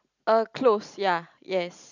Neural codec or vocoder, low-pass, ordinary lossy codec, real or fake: none; 7.2 kHz; none; real